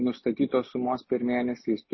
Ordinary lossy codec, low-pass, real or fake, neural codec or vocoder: MP3, 24 kbps; 7.2 kHz; real; none